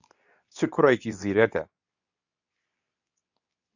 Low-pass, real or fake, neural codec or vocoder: 7.2 kHz; fake; codec, 24 kHz, 0.9 kbps, WavTokenizer, medium speech release version 2